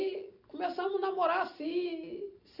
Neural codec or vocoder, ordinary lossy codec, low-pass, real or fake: none; none; 5.4 kHz; real